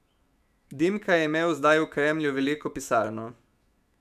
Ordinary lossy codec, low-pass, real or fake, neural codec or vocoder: none; 14.4 kHz; fake; autoencoder, 48 kHz, 128 numbers a frame, DAC-VAE, trained on Japanese speech